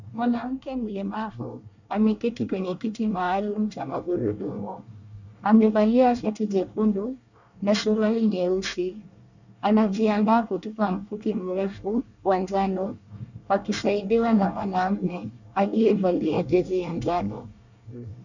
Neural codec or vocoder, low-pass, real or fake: codec, 24 kHz, 1 kbps, SNAC; 7.2 kHz; fake